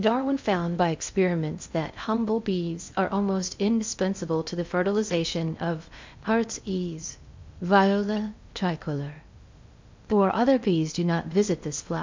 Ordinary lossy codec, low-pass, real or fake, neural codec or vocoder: MP3, 64 kbps; 7.2 kHz; fake; codec, 16 kHz in and 24 kHz out, 0.6 kbps, FocalCodec, streaming, 2048 codes